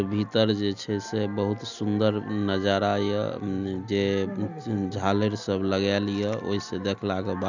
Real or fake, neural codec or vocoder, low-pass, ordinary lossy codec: real; none; 7.2 kHz; none